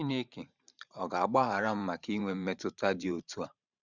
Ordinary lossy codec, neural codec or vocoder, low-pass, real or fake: none; vocoder, 44.1 kHz, 128 mel bands every 512 samples, BigVGAN v2; 7.2 kHz; fake